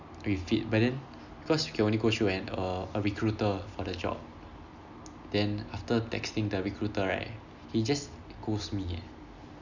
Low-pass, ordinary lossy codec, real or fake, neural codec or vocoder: 7.2 kHz; none; real; none